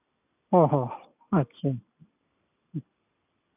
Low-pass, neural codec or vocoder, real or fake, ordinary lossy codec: 3.6 kHz; none; real; none